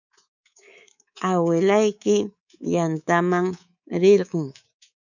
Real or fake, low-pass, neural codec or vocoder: fake; 7.2 kHz; codec, 24 kHz, 3.1 kbps, DualCodec